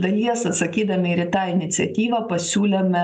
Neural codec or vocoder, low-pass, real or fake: none; 9.9 kHz; real